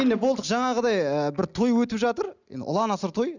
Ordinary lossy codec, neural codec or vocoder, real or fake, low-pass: none; none; real; 7.2 kHz